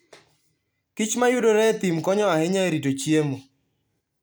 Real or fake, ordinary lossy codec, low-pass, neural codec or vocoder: real; none; none; none